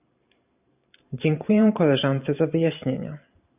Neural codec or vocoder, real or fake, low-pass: vocoder, 44.1 kHz, 128 mel bands every 512 samples, BigVGAN v2; fake; 3.6 kHz